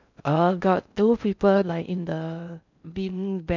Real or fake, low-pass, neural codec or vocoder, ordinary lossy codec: fake; 7.2 kHz; codec, 16 kHz in and 24 kHz out, 0.6 kbps, FocalCodec, streaming, 2048 codes; none